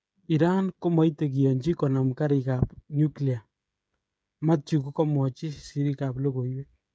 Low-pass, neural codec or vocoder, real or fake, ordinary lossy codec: none; codec, 16 kHz, 16 kbps, FreqCodec, smaller model; fake; none